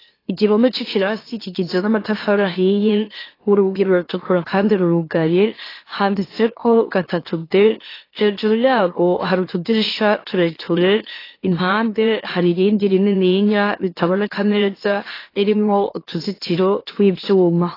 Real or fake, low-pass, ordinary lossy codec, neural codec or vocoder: fake; 5.4 kHz; AAC, 24 kbps; autoencoder, 44.1 kHz, a latent of 192 numbers a frame, MeloTTS